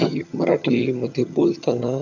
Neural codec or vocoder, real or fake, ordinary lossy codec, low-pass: vocoder, 22.05 kHz, 80 mel bands, HiFi-GAN; fake; none; 7.2 kHz